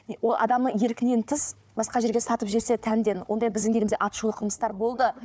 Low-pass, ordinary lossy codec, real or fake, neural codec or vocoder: none; none; fake; codec, 16 kHz, 4 kbps, FunCodec, trained on Chinese and English, 50 frames a second